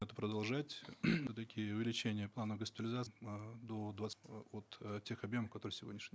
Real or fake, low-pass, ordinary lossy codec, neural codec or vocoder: real; none; none; none